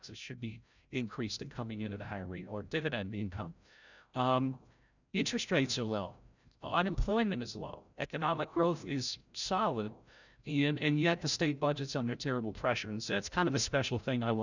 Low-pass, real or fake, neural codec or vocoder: 7.2 kHz; fake; codec, 16 kHz, 0.5 kbps, FreqCodec, larger model